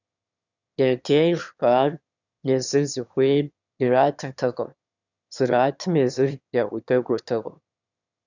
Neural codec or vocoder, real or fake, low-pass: autoencoder, 22.05 kHz, a latent of 192 numbers a frame, VITS, trained on one speaker; fake; 7.2 kHz